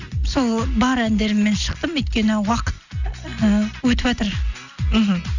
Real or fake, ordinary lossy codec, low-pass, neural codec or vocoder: real; none; 7.2 kHz; none